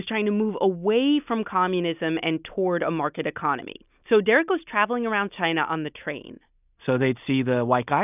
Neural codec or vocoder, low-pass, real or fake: none; 3.6 kHz; real